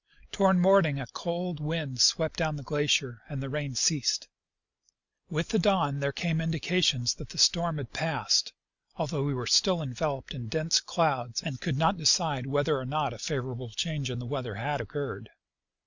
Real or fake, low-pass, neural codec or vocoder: real; 7.2 kHz; none